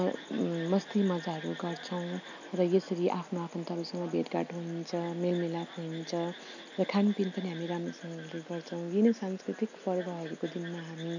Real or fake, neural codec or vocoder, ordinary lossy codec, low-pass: real; none; none; 7.2 kHz